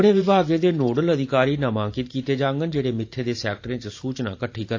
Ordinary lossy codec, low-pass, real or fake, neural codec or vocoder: AAC, 48 kbps; 7.2 kHz; fake; vocoder, 44.1 kHz, 128 mel bands every 512 samples, BigVGAN v2